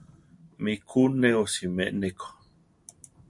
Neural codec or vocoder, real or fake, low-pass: none; real; 10.8 kHz